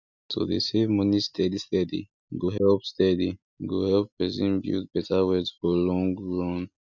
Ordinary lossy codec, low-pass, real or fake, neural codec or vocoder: none; 7.2 kHz; real; none